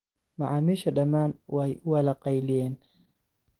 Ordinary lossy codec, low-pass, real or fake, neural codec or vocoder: Opus, 16 kbps; 19.8 kHz; fake; autoencoder, 48 kHz, 128 numbers a frame, DAC-VAE, trained on Japanese speech